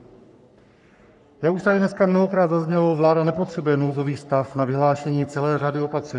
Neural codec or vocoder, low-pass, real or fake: codec, 44.1 kHz, 3.4 kbps, Pupu-Codec; 10.8 kHz; fake